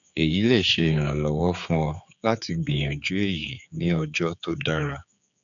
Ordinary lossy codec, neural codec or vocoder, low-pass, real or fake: none; codec, 16 kHz, 4 kbps, X-Codec, HuBERT features, trained on general audio; 7.2 kHz; fake